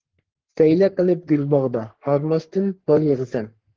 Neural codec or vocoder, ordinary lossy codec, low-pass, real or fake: codec, 44.1 kHz, 3.4 kbps, Pupu-Codec; Opus, 16 kbps; 7.2 kHz; fake